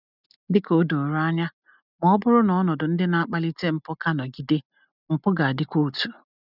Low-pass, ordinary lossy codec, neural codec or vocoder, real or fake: 5.4 kHz; none; none; real